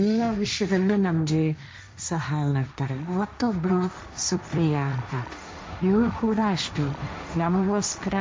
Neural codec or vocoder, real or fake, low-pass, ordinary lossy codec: codec, 16 kHz, 1.1 kbps, Voila-Tokenizer; fake; none; none